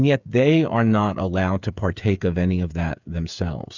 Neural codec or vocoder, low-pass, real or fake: codec, 16 kHz, 8 kbps, FreqCodec, smaller model; 7.2 kHz; fake